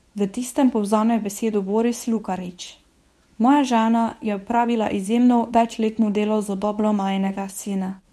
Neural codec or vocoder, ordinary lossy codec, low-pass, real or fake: codec, 24 kHz, 0.9 kbps, WavTokenizer, medium speech release version 1; none; none; fake